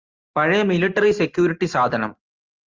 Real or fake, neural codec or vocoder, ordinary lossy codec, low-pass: real; none; Opus, 32 kbps; 7.2 kHz